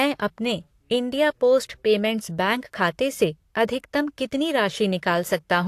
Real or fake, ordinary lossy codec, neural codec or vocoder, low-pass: fake; AAC, 64 kbps; codec, 44.1 kHz, 7.8 kbps, Pupu-Codec; 14.4 kHz